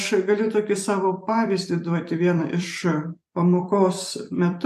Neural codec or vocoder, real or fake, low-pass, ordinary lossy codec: vocoder, 44.1 kHz, 128 mel bands every 256 samples, BigVGAN v2; fake; 14.4 kHz; AAC, 96 kbps